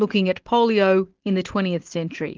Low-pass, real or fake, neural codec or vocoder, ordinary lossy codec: 7.2 kHz; real; none; Opus, 16 kbps